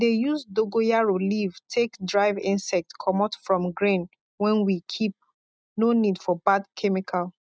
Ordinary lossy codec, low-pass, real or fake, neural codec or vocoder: none; none; real; none